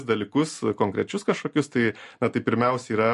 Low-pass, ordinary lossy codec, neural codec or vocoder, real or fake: 14.4 kHz; MP3, 48 kbps; none; real